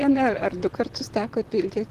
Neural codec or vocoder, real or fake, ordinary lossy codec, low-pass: vocoder, 44.1 kHz, 128 mel bands, Pupu-Vocoder; fake; Opus, 16 kbps; 14.4 kHz